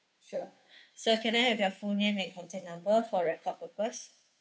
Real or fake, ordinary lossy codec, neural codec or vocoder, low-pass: fake; none; codec, 16 kHz, 2 kbps, FunCodec, trained on Chinese and English, 25 frames a second; none